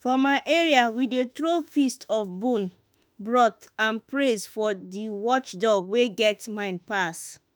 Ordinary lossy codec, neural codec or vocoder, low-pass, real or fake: none; autoencoder, 48 kHz, 32 numbers a frame, DAC-VAE, trained on Japanese speech; none; fake